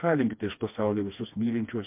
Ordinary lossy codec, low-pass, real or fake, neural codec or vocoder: MP3, 32 kbps; 3.6 kHz; fake; codec, 16 kHz, 2 kbps, FreqCodec, smaller model